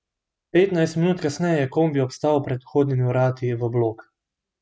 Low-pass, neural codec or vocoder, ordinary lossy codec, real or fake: none; none; none; real